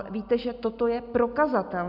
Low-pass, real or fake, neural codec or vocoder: 5.4 kHz; real; none